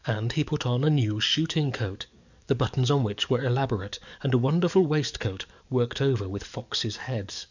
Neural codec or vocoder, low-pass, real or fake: codec, 44.1 kHz, 7.8 kbps, DAC; 7.2 kHz; fake